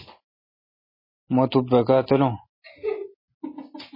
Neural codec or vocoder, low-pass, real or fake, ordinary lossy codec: none; 5.4 kHz; real; MP3, 24 kbps